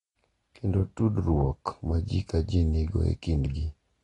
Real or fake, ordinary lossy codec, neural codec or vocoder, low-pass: real; AAC, 32 kbps; none; 10.8 kHz